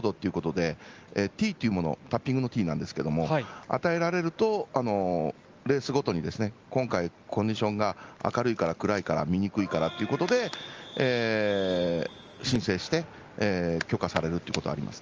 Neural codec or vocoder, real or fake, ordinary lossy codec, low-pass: none; real; Opus, 24 kbps; 7.2 kHz